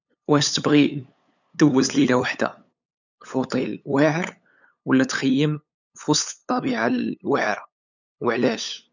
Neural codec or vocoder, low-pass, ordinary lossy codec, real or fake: codec, 16 kHz, 8 kbps, FunCodec, trained on LibriTTS, 25 frames a second; 7.2 kHz; none; fake